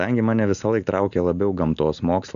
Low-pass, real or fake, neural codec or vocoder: 7.2 kHz; real; none